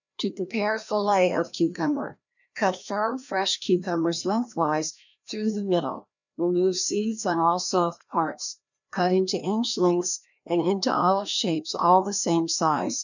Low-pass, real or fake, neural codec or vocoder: 7.2 kHz; fake; codec, 16 kHz, 1 kbps, FreqCodec, larger model